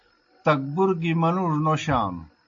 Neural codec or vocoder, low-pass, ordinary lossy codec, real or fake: none; 7.2 kHz; AAC, 48 kbps; real